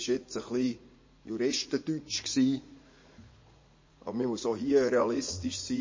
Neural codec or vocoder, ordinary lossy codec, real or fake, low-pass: vocoder, 44.1 kHz, 80 mel bands, Vocos; MP3, 32 kbps; fake; 7.2 kHz